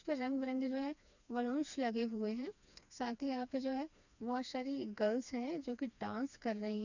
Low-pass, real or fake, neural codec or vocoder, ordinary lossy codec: 7.2 kHz; fake; codec, 16 kHz, 2 kbps, FreqCodec, smaller model; none